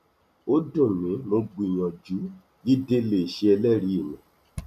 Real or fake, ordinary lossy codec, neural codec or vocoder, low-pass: real; none; none; 14.4 kHz